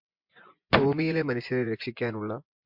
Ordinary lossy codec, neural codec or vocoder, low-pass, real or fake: MP3, 48 kbps; vocoder, 24 kHz, 100 mel bands, Vocos; 5.4 kHz; fake